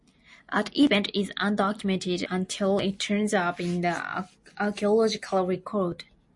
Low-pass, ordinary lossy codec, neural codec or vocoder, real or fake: 10.8 kHz; MP3, 48 kbps; none; real